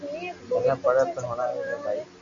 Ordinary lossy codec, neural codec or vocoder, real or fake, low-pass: MP3, 48 kbps; none; real; 7.2 kHz